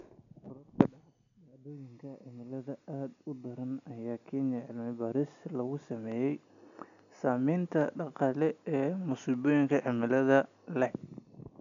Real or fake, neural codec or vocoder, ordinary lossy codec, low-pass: real; none; none; 7.2 kHz